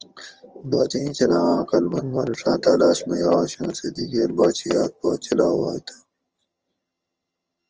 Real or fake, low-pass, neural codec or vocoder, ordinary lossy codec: fake; 7.2 kHz; vocoder, 22.05 kHz, 80 mel bands, HiFi-GAN; Opus, 24 kbps